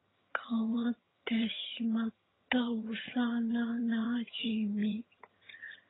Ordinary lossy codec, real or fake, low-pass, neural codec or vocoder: AAC, 16 kbps; fake; 7.2 kHz; vocoder, 22.05 kHz, 80 mel bands, HiFi-GAN